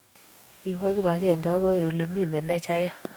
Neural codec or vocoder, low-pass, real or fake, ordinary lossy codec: codec, 44.1 kHz, 2.6 kbps, DAC; none; fake; none